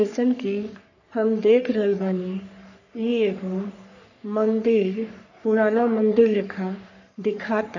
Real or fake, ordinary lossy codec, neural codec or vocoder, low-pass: fake; none; codec, 44.1 kHz, 3.4 kbps, Pupu-Codec; 7.2 kHz